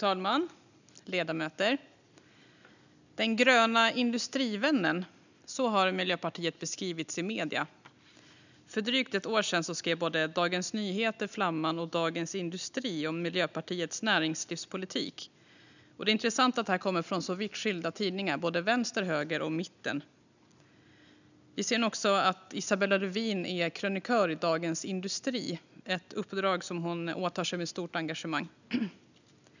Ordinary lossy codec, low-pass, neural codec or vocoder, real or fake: none; 7.2 kHz; none; real